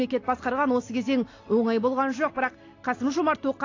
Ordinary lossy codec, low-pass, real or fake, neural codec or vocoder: AAC, 32 kbps; 7.2 kHz; real; none